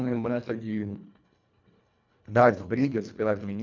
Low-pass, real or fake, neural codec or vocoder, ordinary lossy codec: 7.2 kHz; fake; codec, 24 kHz, 1.5 kbps, HILCodec; none